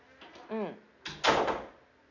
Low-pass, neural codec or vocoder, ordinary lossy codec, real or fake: 7.2 kHz; none; AAC, 48 kbps; real